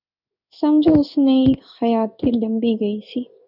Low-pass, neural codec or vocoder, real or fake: 5.4 kHz; codec, 16 kHz in and 24 kHz out, 1 kbps, XY-Tokenizer; fake